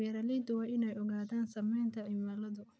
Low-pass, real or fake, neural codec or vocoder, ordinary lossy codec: none; real; none; none